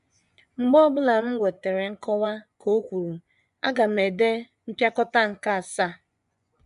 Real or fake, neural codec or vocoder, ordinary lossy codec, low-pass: fake; vocoder, 24 kHz, 100 mel bands, Vocos; none; 10.8 kHz